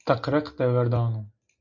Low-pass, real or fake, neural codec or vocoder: 7.2 kHz; real; none